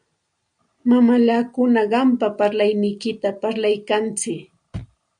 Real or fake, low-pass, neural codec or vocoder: real; 9.9 kHz; none